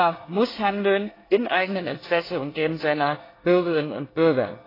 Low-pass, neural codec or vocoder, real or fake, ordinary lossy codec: 5.4 kHz; codec, 24 kHz, 1 kbps, SNAC; fake; AAC, 24 kbps